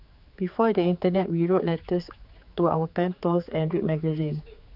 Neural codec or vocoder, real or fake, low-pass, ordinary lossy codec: codec, 16 kHz, 4 kbps, X-Codec, HuBERT features, trained on general audio; fake; 5.4 kHz; none